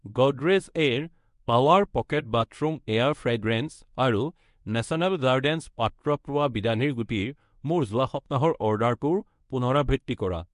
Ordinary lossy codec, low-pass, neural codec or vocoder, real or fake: MP3, 64 kbps; 10.8 kHz; codec, 24 kHz, 0.9 kbps, WavTokenizer, medium speech release version 1; fake